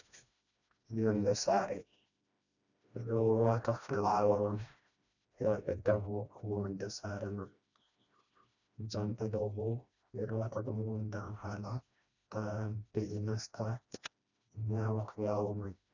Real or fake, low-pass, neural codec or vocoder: fake; 7.2 kHz; codec, 16 kHz, 1 kbps, FreqCodec, smaller model